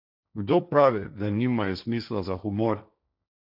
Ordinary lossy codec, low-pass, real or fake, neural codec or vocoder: none; 5.4 kHz; fake; codec, 16 kHz, 1.1 kbps, Voila-Tokenizer